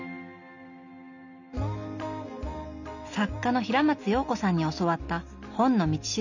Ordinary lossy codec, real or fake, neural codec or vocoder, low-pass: none; real; none; 7.2 kHz